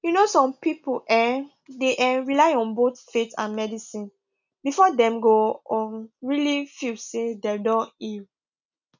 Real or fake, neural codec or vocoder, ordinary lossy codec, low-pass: real; none; none; 7.2 kHz